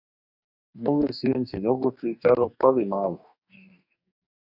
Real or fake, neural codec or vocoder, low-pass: fake; codec, 44.1 kHz, 2.6 kbps, DAC; 5.4 kHz